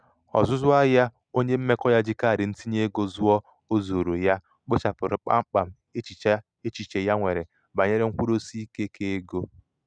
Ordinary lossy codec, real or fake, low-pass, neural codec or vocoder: none; real; 9.9 kHz; none